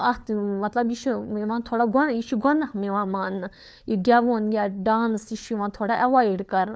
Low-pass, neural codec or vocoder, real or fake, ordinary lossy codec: none; codec, 16 kHz, 4 kbps, FunCodec, trained on LibriTTS, 50 frames a second; fake; none